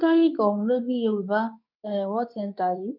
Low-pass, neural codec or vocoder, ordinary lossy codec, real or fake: 5.4 kHz; codec, 24 kHz, 0.9 kbps, WavTokenizer, medium speech release version 2; none; fake